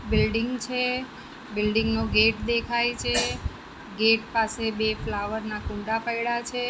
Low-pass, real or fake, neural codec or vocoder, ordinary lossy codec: none; real; none; none